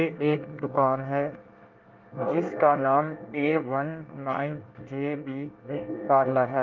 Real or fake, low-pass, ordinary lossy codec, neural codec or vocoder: fake; 7.2 kHz; Opus, 32 kbps; codec, 24 kHz, 1 kbps, SNAC